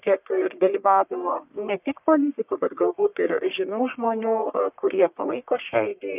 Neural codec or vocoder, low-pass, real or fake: codec, 44.1 kHz, 1.7 kbps, Pupu-Codec; 3.6 kHz; fake